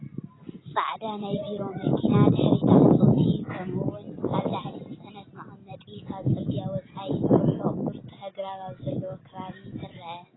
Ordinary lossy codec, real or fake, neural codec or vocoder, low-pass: AAC, 16 kbps; real; none; 7.2 kHz